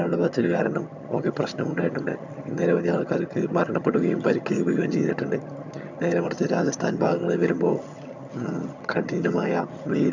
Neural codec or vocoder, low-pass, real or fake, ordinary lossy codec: vocoder, 22.05 kHz, 80 mel bands, HiFi-GAN; 7.2 kHz; fake; none